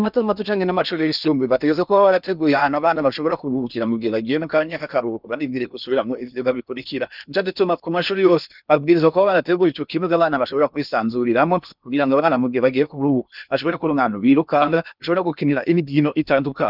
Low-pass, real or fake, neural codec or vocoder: 5.4 kHz; fake; codec, 16 kHz in and 24 kHz out, 0.8 kbps, FocalCodec, streaming, 65536 codes